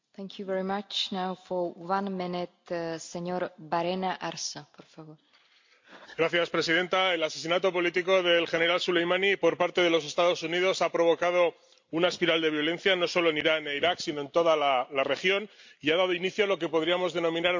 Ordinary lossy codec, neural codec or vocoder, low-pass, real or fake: MP3, 48 kbps; none; 7.2 kHz; real